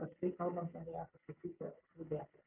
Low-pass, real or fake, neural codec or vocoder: 3.6 kHz; real; none